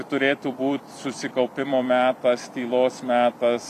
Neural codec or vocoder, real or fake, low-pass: vocoder, 44.1 kHz, 128 mel bands every 256 samples, BigVGAN v2; fake; 14.4 kHz